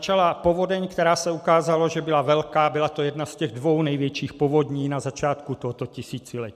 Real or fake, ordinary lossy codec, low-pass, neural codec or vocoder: real; MP3, 96 kbps; 14.4 kHz; none